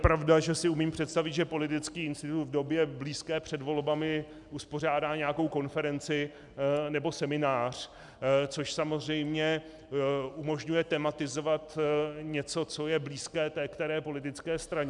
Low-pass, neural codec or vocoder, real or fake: 10.8 kHz; none; real